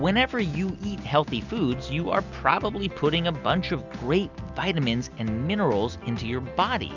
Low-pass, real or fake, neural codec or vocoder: 7.2 kHz; real; none